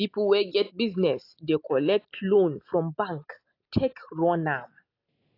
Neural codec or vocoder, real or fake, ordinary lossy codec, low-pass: none; real; AAC, 32 kbps; 5.4 kHz